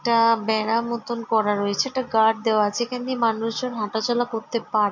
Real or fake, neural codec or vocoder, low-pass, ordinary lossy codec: real; none; 7.2 kHz; MP3, 48 kbps